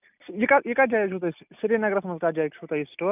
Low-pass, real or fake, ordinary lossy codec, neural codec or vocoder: 3.6 kHz; real; none; none